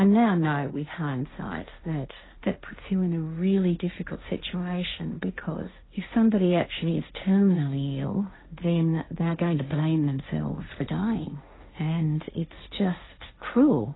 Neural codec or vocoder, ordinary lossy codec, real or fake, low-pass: codec, 16 kHz, 1.1 kbps, Voila-Tokenizer; AAC, 16 kbps; fake; 7.2 kHz